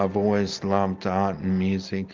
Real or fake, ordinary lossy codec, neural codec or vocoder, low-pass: real; Opus, 32 kbps; none; 7.2 kHz